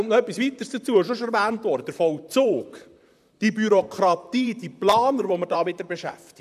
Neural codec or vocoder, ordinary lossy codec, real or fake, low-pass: vocoder, 44.1 kHz, 128 mel bands, Pupu-Vocoder; none; fake; 14.4 kHz